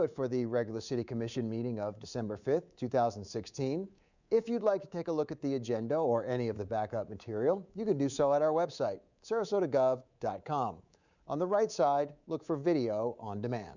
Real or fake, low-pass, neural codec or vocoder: fake; 7.2 kHz; codec, 24 kHz, 3.1 kbps, DualCodec